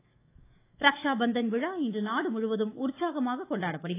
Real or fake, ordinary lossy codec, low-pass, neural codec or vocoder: fake; AAC, 24 kbps; 3.6 kHz; autoencoder, 48 kHz, 128 numbers a frame, DAC-VAE, trained on Japanese speech